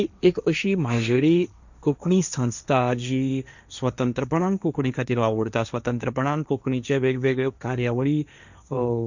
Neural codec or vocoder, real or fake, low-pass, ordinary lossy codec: codec, 16 kHz, 1.1 kbps, Voila-Tokenizer; fake; 7.2 kHz; none